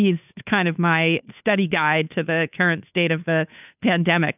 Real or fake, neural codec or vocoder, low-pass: fake; codec, 16 kHz, 2 kbps, FunCodec, trained on Chinese and English, 25 frames a second; 3.6 kHz